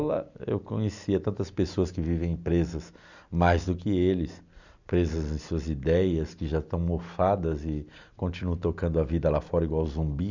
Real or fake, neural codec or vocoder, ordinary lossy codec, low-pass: real; none; none; 7.2 kHz